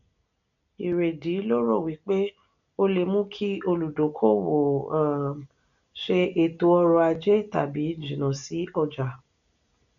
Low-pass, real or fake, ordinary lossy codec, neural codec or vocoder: 7.2 kHz; real; none; none